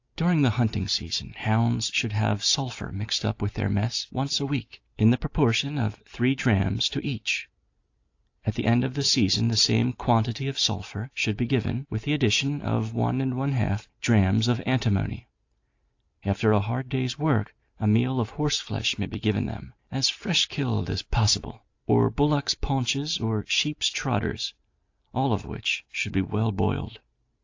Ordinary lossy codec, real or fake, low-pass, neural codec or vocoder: AAC, 48 kbps; real; 7.2 kHz; none